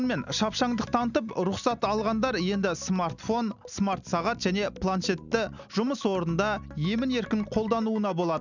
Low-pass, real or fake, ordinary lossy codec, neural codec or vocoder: 7.2 kHz; real; none; none